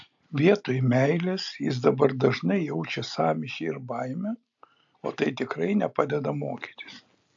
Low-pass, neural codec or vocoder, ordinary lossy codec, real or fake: 7.2 kHz; none; AAC, 64 kbps; real